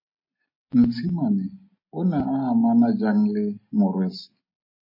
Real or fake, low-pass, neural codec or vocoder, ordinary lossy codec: real; 5.4 kHz; none; MP3, 24 kbps